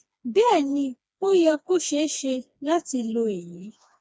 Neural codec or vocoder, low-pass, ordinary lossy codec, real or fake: codec, 16 kHz, 2 kbps, FreqCodec, smaller model; none; none; fake